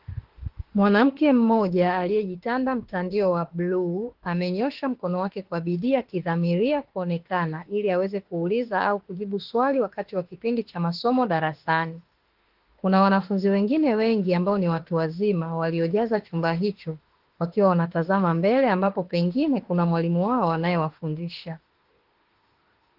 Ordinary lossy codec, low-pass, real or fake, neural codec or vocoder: Opus, 16 kbps; 5.4 kHz; fake; autoencoder, 48 kHz, 32 numbers a frame, DAC-VAE, trained on Japanese speech